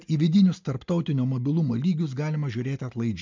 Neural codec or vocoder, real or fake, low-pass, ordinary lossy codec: none; real; 7.2 kHz; MP3, 64 kbps